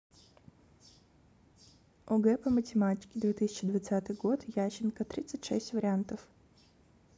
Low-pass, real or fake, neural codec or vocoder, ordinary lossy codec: none; real; none; none